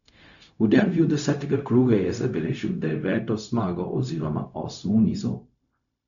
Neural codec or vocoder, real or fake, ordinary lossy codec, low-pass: codec, 16 kHz, 0.4 kbps, LongCat-Audio-Codec; fake; none; 7.2 kHz